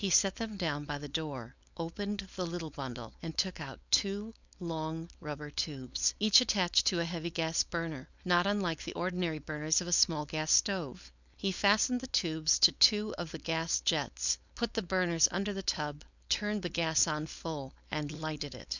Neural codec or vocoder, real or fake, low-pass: codec, 16 kHz, 4.8 kbps, FACodec; fake; 7.2 kHz